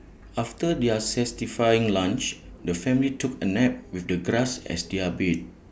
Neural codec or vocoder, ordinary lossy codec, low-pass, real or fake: none; none; none; real